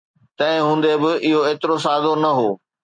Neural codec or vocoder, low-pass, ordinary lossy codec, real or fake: none; 9.9 kHz; MP3, 64 kbps; real